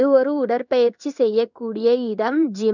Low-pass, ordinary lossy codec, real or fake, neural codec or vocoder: 7.2 kHz; none; fake; codec, 16 kHz in and 24 kHz out, 1 kbps, XY-Tokenizer